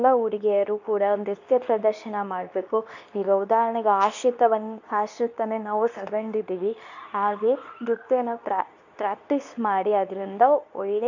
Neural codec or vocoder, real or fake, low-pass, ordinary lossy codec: codec, 24 kHz, 0.9 kbps, WavTokenizer, medium speech release version 2; fake; 7.2 kHz; none